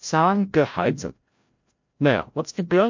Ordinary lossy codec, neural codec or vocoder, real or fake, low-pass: MP3, 48 kbps; codec, 16 kHz, 0.5 kbps, FreqCodec, larger model; fake; 7.2 kHz